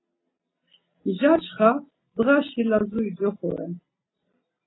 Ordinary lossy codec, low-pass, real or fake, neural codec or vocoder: AAC, 16 kbps; 7.2 kHz; real; none